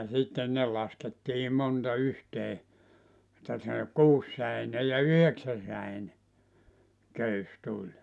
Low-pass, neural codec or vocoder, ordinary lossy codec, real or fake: none; none; none; real